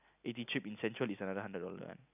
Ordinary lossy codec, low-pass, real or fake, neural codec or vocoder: none; 3.6 kHz; real; none